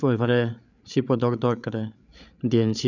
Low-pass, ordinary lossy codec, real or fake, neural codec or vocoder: 7.2 kHz; none; fake; codec, 16 kHz, 8 kbps, FreqCodec, larger model